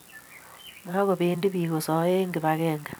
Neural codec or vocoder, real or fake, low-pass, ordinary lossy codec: vocoder, 44.1 kHz, 128 mel bands every 256 samples, BigVGAN v2; fake; none; none